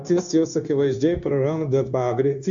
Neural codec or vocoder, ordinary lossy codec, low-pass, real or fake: codec, 16 kHz, 0.9 kbps, LongCat-Audio-Codec; MP3, 64 kbps; 7.2 kHz; fake